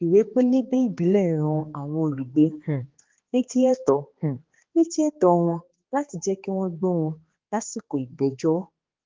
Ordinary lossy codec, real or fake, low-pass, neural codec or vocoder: Opus, 16 kbps; fake; 7.2 kHz; codec, 16 kHz, 2 kbps, X-Codec, HuBERT features, trained on balanced general audio